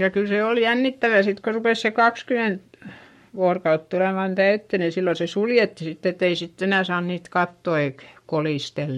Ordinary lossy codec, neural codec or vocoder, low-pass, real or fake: MP3, 64 kbps; codec, 44.1 kHz, 7.8 kbps, Pupu-Codec; 19.8 kHz; fake